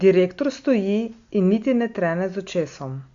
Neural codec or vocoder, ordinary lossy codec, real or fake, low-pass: none; Opus, 64 kbps; real; 7.2 kHz